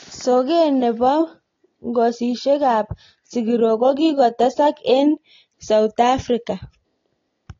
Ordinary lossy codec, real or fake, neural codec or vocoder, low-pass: AAC, 32 kbps; real; none; 7.2 kHz